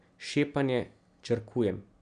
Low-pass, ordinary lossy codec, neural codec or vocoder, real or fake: 9.9 kHz; none; none; real